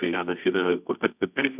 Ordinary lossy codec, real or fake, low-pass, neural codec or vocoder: AAC, 32 kbps; fake; 3.6 kHz; codec, 24 kHz, 0.9 kbps, WavTokenizer, medium music audio release